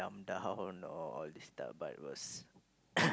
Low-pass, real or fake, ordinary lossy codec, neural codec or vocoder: none; real; none; none